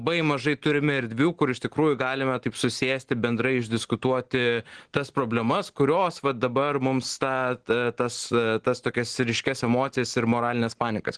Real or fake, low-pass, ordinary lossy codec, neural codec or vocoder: real; 9.9 kHz; Opus, 16 kbps; none